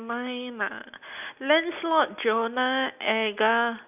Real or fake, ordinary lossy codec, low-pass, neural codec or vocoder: real; none; 3.6 kHz; none